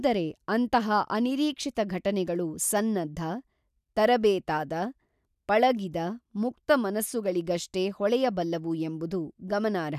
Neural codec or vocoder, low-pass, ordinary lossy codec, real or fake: none; 14.4 kHz; none; real